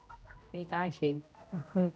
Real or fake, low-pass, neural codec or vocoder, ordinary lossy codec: fake; none; codec, 16 kHz, 0.5 kbps, X-Codec, HuBERT features, trained on general audio; none